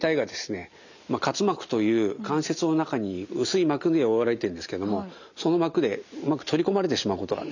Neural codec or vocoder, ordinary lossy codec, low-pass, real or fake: none; none; 7.2 kHz; real